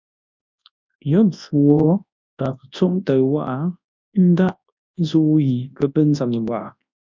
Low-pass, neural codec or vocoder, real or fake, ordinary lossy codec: 7.2 kHz; codec, 24 kHz, 0.9 kbps, WavTokenizer, large speech release; fake; MP3, 64 kbps